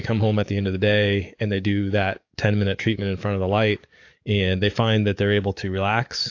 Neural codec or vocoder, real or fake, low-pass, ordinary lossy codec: codec, 44.1 kHz, 7.8 kbps, DAC; fake; 7.2 kHz; AAC, 48 kbps